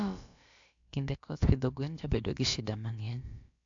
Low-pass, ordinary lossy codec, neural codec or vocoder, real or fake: 7.2 kHz; AAC, 64 kbps; codec, 16 kHz, about 1 kbps, DyCAST, with the encoder's durations; fake